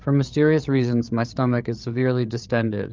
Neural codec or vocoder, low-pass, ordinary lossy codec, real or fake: codec, 16 kHz, 8 kbps, FreqCodec, larger model; 7.2 kHz; Opus, 32 kbps; fake